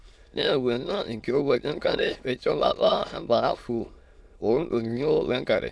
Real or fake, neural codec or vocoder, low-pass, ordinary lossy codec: fake; autoencoder, 22.05 kHz, a latent of 192 numbers a frame, VITS, trained on many speakers; none; none